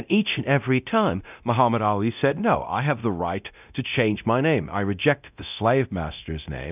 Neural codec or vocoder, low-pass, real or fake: codec, 24 kHz, 0.9 kbps, DualCodec; 3.6 kHz; fake